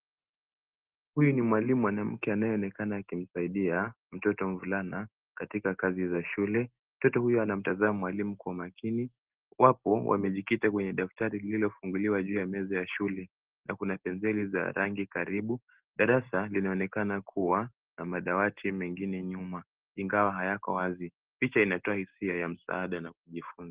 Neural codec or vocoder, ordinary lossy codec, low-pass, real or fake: none; Opus, 16 kbps; 3.6 kHz; real